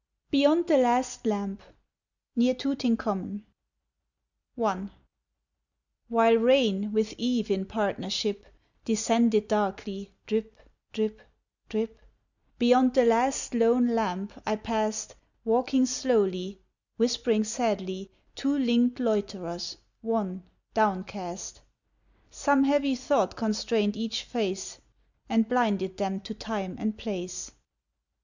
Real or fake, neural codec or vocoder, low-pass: real; none; 7.2 kHz